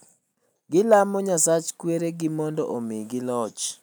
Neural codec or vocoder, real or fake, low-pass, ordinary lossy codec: none; real; none; none